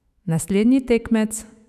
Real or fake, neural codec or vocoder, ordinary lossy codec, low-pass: fake; autoencoder, 48 kHz, 128 numbers a frame, DAC-VAE, trained on Japanese speech; none; 14.4 kHz